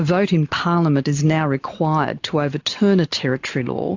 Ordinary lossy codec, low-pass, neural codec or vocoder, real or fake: AAC, 48 kbps; 7.2 kHz; none; real